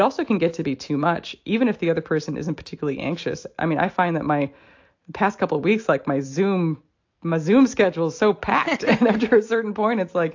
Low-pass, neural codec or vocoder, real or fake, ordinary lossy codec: 7.2 kHz; none; real; AAC, 48 kbps